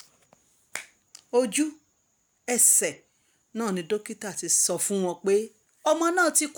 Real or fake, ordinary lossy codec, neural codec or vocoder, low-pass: real; none; none; none